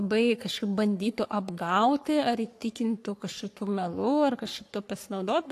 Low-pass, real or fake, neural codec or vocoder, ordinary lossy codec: 14.4 kHz; fake; codec, 44.1 kHz, 3.4 kbps, Pupu-Codec; AAC, 64 kbps